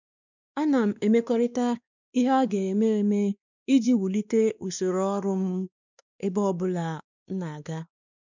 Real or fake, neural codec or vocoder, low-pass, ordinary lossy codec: fake; codec, 16 kHz, 2 kbps, X-Codec, WavLM features, trained on Multilingual LibriSpeech; 7.2 kHz; none